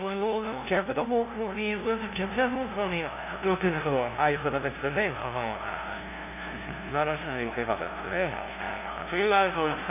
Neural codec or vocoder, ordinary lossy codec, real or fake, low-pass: codec, 16 kHz, 0.5 kbps, FunCodec, trained on LibriTTS, 25 frames a second; none; fake; 3.6 kHz